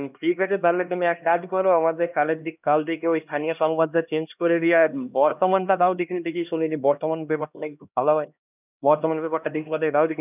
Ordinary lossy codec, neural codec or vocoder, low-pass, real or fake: none; codec, 16 kHz, 1 kbps, X-Codec, HuBERT features, trained on LibriSpeech; 3.6 kHz; fake